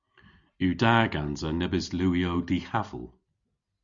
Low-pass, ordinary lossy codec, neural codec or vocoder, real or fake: 7.2 kHz; Opus, 64 kbps; none; real